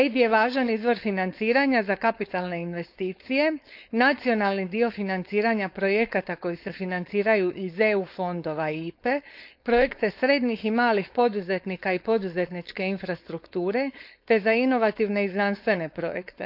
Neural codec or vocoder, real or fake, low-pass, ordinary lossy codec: codec, 16 kHz, 4.8 kbps, FACodec; fake; 5.4 kHz; none